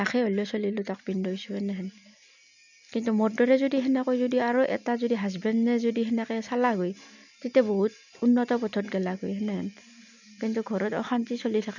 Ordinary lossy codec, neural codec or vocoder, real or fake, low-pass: none; none; real; 7.2 kHz